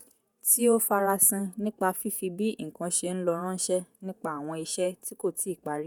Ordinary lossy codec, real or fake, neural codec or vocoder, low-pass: none; fake; vocoder, 48 kHz, 128 mel bands, Vocos; none